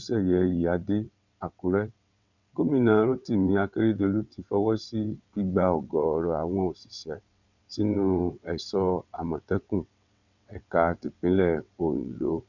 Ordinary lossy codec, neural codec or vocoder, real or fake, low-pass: none; vocoder, 22.05 kHz, 80 mel bands, WaveNeXt; fake; 7.2 kHz